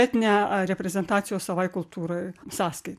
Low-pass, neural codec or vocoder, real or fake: 14.4 kHz; none; real